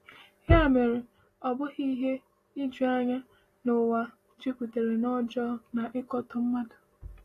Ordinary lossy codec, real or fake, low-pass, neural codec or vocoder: AAC, 48 kbps; real; 14.4 kHz; none